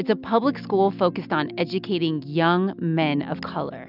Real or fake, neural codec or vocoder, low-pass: real; none; 5.4 kHz